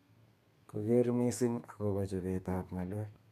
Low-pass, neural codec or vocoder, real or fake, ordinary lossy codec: 14.4 kHz; codec, 32 kHz, 1.9 kbps, SNAC; fake; none